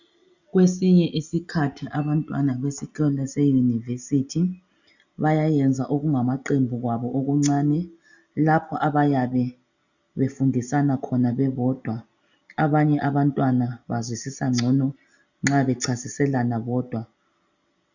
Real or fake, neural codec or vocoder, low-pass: real; none; 7.2 kHz